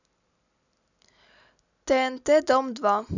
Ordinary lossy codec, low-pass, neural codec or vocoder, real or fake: none; 7.2 kHz; none; real